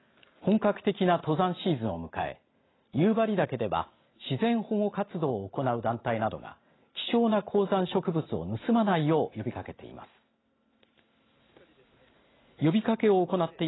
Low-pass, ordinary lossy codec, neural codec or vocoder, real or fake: 7.2 kHz; AAC, 16 kbps; none; real